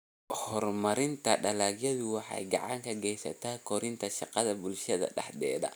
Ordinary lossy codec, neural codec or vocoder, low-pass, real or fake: none; none; none; real